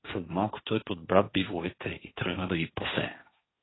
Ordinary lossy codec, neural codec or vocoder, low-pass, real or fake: AAC, 16 kbps; codec, 16 kHz, 1.1 kbps, Voila-Tokenizer; 7.2 kHz; fake